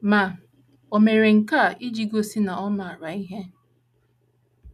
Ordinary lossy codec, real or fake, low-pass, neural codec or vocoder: none; real; 14.4 kHz; none